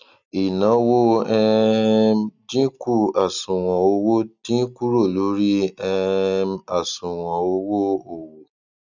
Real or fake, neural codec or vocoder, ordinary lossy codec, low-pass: real; none; none; 7.2 kHz